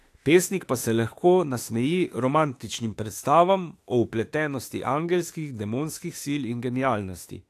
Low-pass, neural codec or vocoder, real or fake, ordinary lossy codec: 14.4 kHz; autoencoder, 48 kHz, 32 numbers a frame, DAC-VAE, trained on Japanese speech; fake; AAC, 64 kbps